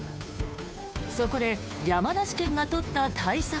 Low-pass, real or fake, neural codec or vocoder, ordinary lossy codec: none; fake; codec, 16 kHz, 2 kbps, FunCodec, trained on Chinese and English, 25 frames a second; none